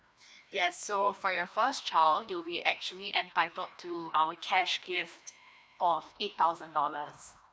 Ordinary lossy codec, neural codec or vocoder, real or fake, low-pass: none; codec, 16 kHz, 1 kbps, FreqCodec, larger model; fake; none